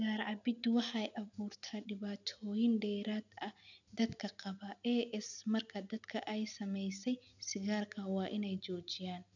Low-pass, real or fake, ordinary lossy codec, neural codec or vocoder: 7.2 kHz; real; none; none